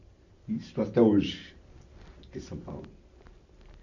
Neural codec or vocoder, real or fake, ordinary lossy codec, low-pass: none; real; none; 7.2 kHz